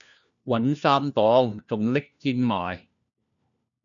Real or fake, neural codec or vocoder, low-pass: fake; codec, 16 kHz, 1 kbps, FunCodec, trained on LibriTTS, 50 frames a second; 7.2 kHz